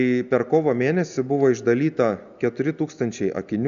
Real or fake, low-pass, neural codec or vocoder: real; 7.2 kHz; none